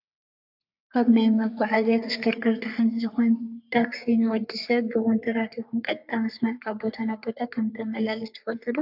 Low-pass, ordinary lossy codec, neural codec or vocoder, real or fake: 5.4 kHz; AAC, 48 kbps; codec, 44.1 kHz, 3.4 kbps, Pupu-Codec; fake